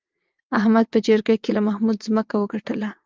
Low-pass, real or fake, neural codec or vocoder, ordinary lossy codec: 7.2 kHz; fake; vocoder, 22.05 kHz, 80 mel bands, WaveNeXt; Opus, 24 kbps